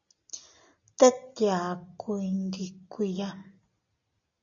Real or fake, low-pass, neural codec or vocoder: real; 7.2 kHz; none